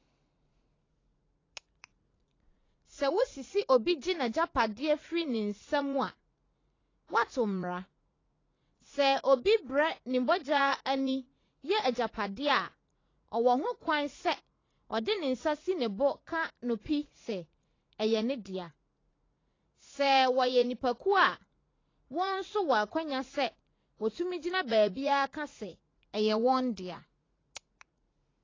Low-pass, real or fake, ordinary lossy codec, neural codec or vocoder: 7.2 kHz; fake; AAC, 32 kbps; vocoder, 44.1 kHz, 80 mel bands, Vocos